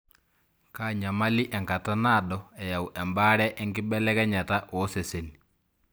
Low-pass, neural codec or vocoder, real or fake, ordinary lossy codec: none; none; real; none